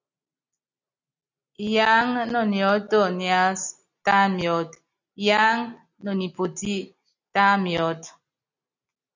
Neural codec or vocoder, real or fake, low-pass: none; real; 7.2 kHz